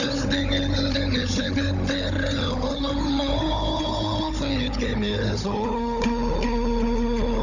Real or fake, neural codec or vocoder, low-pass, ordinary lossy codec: fake; codec, 16 kHz, 16 kbps, FunCodec, trained on Chinese and English, 50 frames a second; 7.2 kHz; none